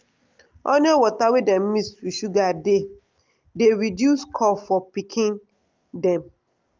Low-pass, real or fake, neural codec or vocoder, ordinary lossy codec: 7.2 kHz; real; none; Opus, 24 kbps